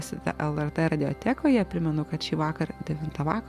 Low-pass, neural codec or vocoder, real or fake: 14.4 kHz; none; real